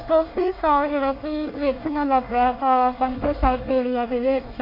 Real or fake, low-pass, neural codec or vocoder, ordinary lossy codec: fake; 5.4 kHz; codec, 24 kHz, 1 kbps, SNAC; MP3, 32 kbps